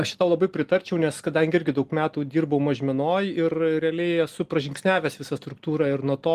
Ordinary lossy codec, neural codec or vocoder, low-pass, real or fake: Opus, 24 kbps; none; 14.4 kHz; real